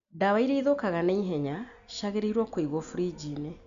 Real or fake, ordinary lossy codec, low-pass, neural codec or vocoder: real; none; 7.2 kHz; none